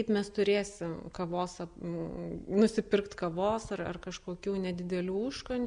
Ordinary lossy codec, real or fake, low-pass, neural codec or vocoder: MP3, 96 kbps; real; 9.9 kHz; none